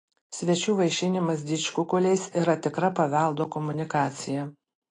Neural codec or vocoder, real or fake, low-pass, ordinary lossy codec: none; real; 9.9 kHz; AAC, 32 kbps